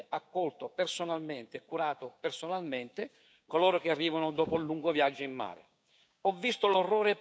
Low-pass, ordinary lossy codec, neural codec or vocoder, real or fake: none; none; codec, 16 kHz, 6 kbps, DAC; fake